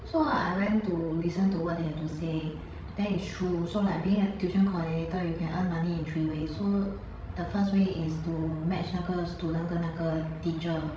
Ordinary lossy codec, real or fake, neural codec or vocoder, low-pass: none; fake; codec, 16 kHz, 16 kbps, FreqCodec, larger model; none